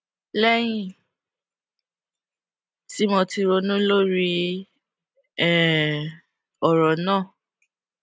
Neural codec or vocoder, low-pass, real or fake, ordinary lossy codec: none; none; real; none